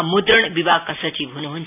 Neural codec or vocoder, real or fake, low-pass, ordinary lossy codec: none; real; 3.6 kHz; none